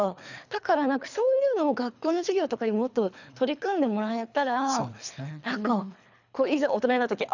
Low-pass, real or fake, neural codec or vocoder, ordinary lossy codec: 7.2 kHz; fake; codec, 24 kHz, 3 kbps, HILCodec; none